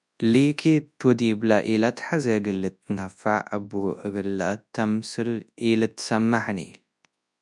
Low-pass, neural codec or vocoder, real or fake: 10.8 kHz; codec, 24 kHz, 0.9 kbps, WavTokenizer, large speech release; fake